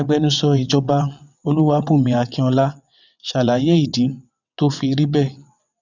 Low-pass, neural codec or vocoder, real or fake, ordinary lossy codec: 7.2 kHz; vocoder, 22.05 kHz, 80 mel bands, WaveNeXt; fake; none